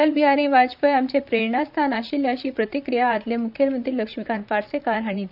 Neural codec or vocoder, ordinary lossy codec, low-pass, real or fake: vocoder, 44.1 kHz, 128 mel bands, Pupu-Vocoder; none; 5.4 kHz; fake